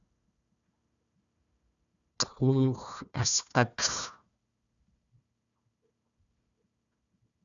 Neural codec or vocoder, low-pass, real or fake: codec, 16 kHz, 1 kbps, FunCodec, trained on Chinese and English, 50 frames a second; 7.2 kHz; fake